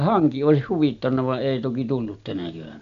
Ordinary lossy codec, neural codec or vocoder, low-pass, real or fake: none; none; 7.2 kHz; real